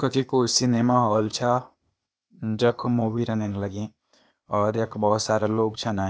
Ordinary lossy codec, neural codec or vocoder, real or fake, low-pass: none; codec, 16 kHz, 0.8 kbps, ZipCodec; fake; none